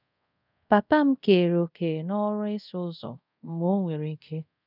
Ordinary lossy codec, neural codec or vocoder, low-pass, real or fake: none; codec, 24 kHz, 0.5 kbps, DualCodec; 5.4 kHz; fake